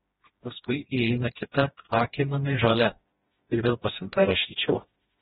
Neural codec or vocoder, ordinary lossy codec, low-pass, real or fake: codec, 16 kHz, 1 kbps, FreqCodec, smaller model; AAC, 16 kbps; 7.2 kHz; fake